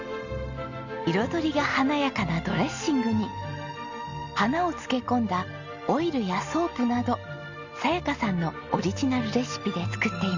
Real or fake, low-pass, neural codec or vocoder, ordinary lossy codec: real; 7.2 kHz; none; Opus, 64 kbps